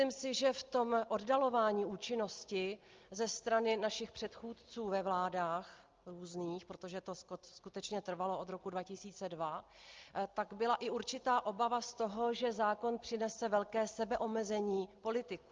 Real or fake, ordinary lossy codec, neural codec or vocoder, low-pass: real; Opus, 24 kbps; none; 7.2 kHz